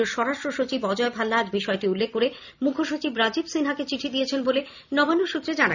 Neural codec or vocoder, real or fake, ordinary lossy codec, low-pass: none; real; none; 7.2 kHz